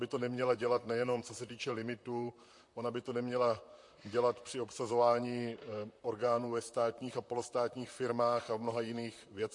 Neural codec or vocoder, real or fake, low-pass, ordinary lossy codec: vocoder, 44.1 kHz, 128 mel bands, Pupu-Vocoder; fake; 10.8 kHz; MP3, 48 kbps